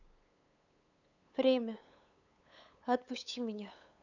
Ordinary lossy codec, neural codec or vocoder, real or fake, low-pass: none; codec, 16 kHz, 8 kbps, FunCodec, trained on LibriTTS, 25 frames a second; fake; 7.2 kHz